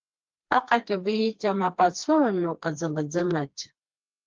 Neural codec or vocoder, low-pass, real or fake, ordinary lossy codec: codec, 16 kHz, 2 kbps, FreqCodec, smaller model; 7.2 kHz; fake; Opus, 24 kbps